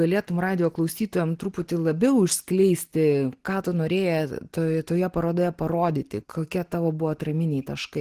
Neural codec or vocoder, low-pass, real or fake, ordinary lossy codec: none; 14.4 kHz; real; Opus, 16 kbps